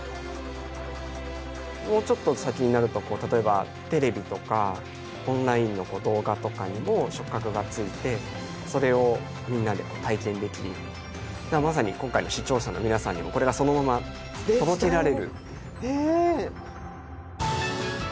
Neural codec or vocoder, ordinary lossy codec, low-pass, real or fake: none; none; none; real